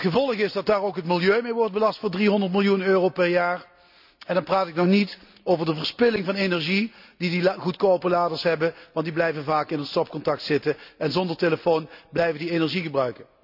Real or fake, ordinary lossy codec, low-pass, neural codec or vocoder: real; none; 5.4 kHz; none